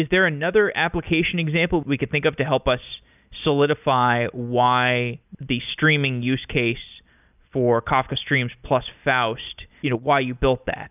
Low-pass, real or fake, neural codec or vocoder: 3.6 kHz; real; none